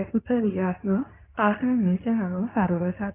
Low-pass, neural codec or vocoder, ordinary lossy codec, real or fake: 3.6 kHz; codec, 24 kHz, 0.9 kbps, WavTokenizer, medium speech release version 2; none; fake